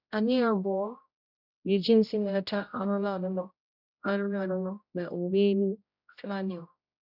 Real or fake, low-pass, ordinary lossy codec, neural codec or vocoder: fake; 5.4 kHz; none; codec, 16 kHz, 0.5 kbps, X-Codec, HuBERT features, trained on general audio